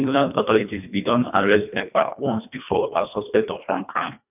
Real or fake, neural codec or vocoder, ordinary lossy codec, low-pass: fake; codec, 24 kHz, 1.5 kbps, HILCodec; none; 3.6 kHz